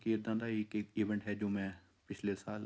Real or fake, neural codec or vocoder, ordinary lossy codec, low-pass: real; none; none; none